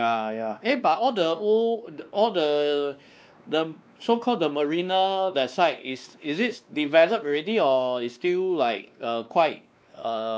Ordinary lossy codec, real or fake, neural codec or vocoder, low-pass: none; fake; codec, 16 kHz, 2 kbps, X-Codec, WavLM features, trained on Multilingual LibriSpeech; none